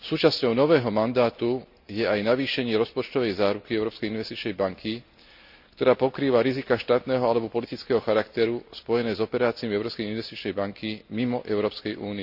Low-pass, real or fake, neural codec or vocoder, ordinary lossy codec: 5.4 kHz; real; none; none